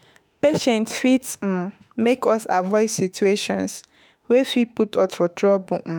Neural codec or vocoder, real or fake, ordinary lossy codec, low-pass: autoencoder, 48 kHz, 32 numbers a frame, DAC-VAE, trained on Japanese speech; fake; none; none